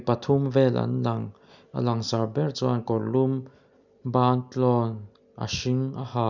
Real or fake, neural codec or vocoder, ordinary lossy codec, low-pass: real; none; none; 7.2 kHz